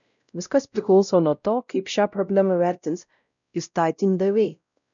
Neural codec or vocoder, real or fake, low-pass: codec, 16 kHz, 0.5 kbps, X-Codec, WavLM features, trained on Multilingual LibriSpeech; fake; 7.2 kHz